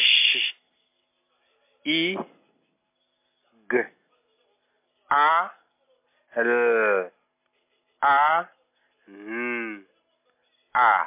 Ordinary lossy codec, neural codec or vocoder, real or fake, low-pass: MP3, 24 kbps; none; real; 3.6 kHz